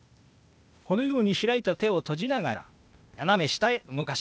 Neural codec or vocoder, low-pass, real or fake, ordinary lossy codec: codec, 16 kHz, 0.8 kbps, ZipCodec; none; fake; none